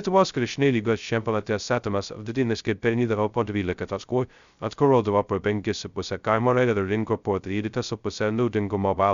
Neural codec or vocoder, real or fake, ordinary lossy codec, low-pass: codec, 16 kHz, 0.2 kbps, FocalCodec; fake; Opus, 64 kbps; 7.2 kHz